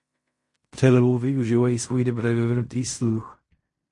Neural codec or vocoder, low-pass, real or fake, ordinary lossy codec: codec, 16 kHz in and 24 kHz out, 0.4 kbps, LongCat-Audio-Codec, fine tuned four codebook decoder; 10.8 kHz; fake; MP3, 48 kbps